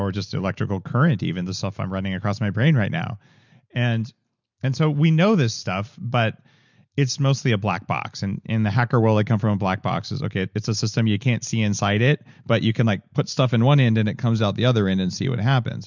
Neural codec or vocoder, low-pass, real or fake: none; 7.2 kHz; real